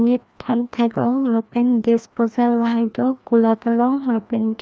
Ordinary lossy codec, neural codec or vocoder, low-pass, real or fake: none; codec, 16 kHz, 1 kbps, FreqCodec, larger model; none; fake